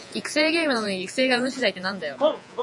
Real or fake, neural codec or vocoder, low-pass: fake; vocoder, 48 kHz, 128 mel bands, Vocos; 10.8 kHz